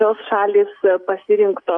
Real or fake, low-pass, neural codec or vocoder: real; 9.9 kHz; none